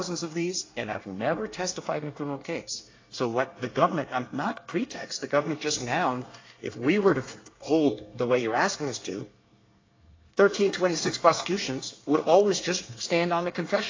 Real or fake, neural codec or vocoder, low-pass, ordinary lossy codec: fake; codec, 24 kHz, 1 kbps, SNAC; 7.2 kHz; AAC, 32 kbps